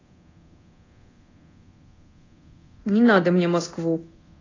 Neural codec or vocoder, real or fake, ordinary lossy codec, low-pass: codec, 24 kHz, 0.9 kbps, DualCodec; fake; AAC, 32 kbps; 7.2 kHz